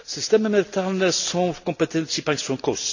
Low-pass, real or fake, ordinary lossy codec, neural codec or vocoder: 7.2 kHz; real; none; none